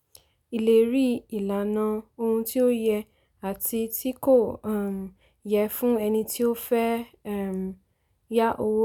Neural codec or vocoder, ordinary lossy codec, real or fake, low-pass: none; none; real; 19.8 kHz